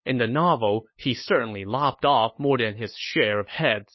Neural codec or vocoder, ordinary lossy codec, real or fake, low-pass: codec, 16 kHz, 8 kbps, FunCodec, trained on LibriTTS, 25 frames a second; MP3, 24 kbps; fake; 7.2 kHz